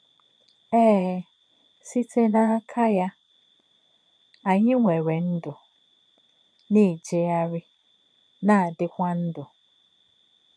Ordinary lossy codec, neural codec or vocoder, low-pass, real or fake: none; vocoder, 22.05 kHz, 80 mel bands, Vocos; none; fake